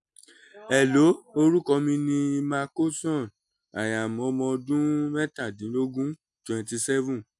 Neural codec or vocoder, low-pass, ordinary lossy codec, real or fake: none; 10.8 kHz; MP3, 96 kbps; real